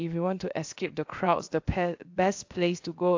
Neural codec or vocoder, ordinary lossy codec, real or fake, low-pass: codec, 16 kHz, about 1 kbps, DyCAST, with the encoder's durations; AAC, 48 kbps; fake; 7.2 kHz